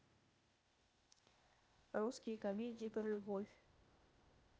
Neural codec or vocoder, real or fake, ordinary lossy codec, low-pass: codec, 16 kHz, 0.8 kbps, ZipCodec; fake; none; none